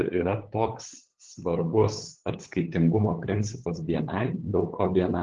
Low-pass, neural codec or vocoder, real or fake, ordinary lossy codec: 7.2 kHz; codec, 16 kHz, 8 kbps, FunCodec, trained on LibriTTS, 25 frames a second; fake; Opus, 16 kbps